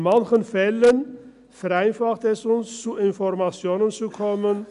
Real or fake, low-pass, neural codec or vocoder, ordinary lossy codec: real; 10.8 kHz; none; none